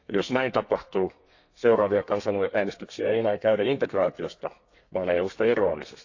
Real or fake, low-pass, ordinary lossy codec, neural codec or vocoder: fake; 7.2 kHz; AAC, 48 kbps; codec, 32 kHz, 1.9 kbps, SNAC